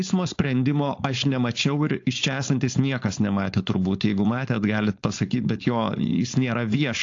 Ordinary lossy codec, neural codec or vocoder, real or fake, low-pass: AAC, 48 kbps; codec, 16 kHz, 4.8 kbps, FACodec; fake; 7.2 kHz